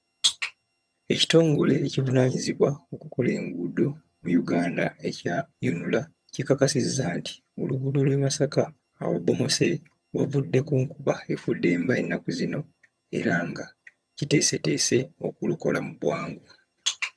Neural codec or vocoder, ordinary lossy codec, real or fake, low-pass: vocoder, 22.05 kHz, 80 mel bands, HiFi-GAN; none; fake; none